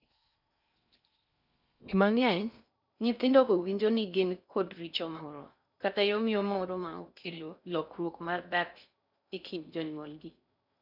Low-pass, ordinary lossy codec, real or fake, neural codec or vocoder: 5.4 kHz; none; fake; codec, 16 kHz in and 24 kHz out, 0.6 kbps, FocalCodec, streaming, 4096 codes